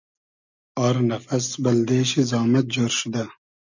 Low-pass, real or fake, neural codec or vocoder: 7.2 kHz; real; none